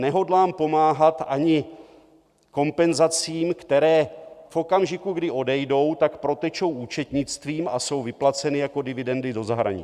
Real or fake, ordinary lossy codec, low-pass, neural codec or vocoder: real; Opus, 64 kbps; 14.4 kHz; none